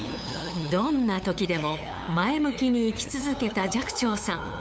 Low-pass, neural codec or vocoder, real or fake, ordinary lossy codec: none; codec, 16 kHz, 8 kbps, FunCodec, trained on LibriTTS, 25 frames a second; fake; none